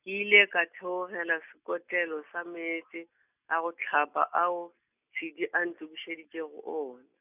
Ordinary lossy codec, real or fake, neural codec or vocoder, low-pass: none; real; none; 3.6 kHz